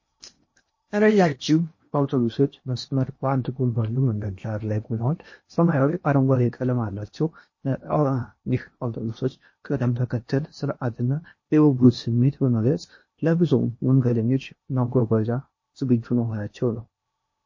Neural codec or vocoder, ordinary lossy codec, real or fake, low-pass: codec, 16 kHz in and 24 kHz out, 0.8 kbps, FocalCodec, streaming, 65536 codes; MP3, 32 kbps; fake; 7.2 kHz